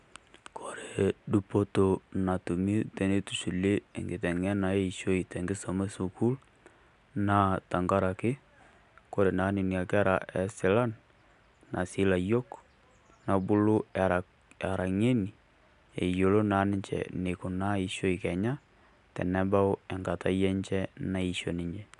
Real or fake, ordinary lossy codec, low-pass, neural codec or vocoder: real; none; 10.8 kHz; none